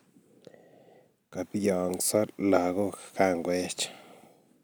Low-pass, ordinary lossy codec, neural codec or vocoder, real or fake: none; none; none; real